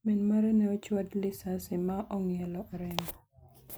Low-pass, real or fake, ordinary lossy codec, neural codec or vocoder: none; real; none; none